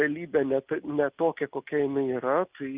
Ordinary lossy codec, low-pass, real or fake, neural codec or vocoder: Opus, 24 kbps; 3.6 kHz; real; none